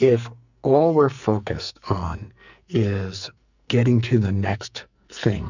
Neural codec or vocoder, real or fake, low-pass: codec, 44.1 kHz, 2.6 kbps, SNAC; fake; 7.2 kHz